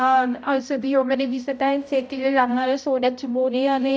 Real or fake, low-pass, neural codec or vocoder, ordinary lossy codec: fake; none; codec, 16 kHz, 0.5 kbps, X-Codec, HuBERT features, trained on balanced general audio; none